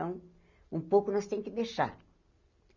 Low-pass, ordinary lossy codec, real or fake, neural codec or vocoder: 7.2 kHz; none; real; none